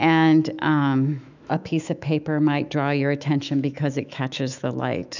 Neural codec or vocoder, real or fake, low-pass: autoencoder, 48 kHz, 128 numbers a frame, DAC-VAE, trained on Japanese speech; fake; 7.2 kHz